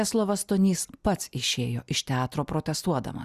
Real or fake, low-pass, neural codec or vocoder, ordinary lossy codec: real; 14.4 kHz; none; Opus, 64 kbps